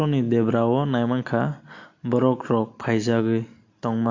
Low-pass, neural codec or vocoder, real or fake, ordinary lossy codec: 7.2 kHz; none; real; AAC, 48 kbps